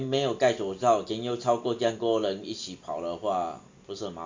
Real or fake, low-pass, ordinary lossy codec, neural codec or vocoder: real; 7.2 kHz; AAC, 48 kbps; none